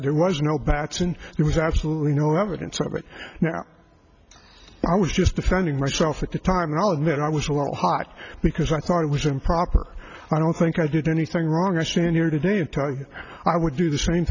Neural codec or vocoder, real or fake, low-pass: none; real; 7.2 kHz